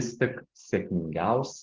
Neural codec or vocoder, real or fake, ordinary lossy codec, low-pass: none; real; Opus, 16 kbps; 7.2 kHz